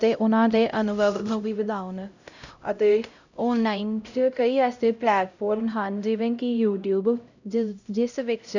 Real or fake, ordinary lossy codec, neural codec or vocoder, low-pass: fake; none; codec, 16 kHz, 0.5 kbps, X-Codec, HuBERT features, trained on LibriSpeech; 7.2 kHz